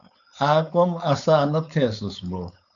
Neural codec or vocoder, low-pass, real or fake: codec, 16 kHz, 4.8 kbps, FACodec; 7.2 kHz; fake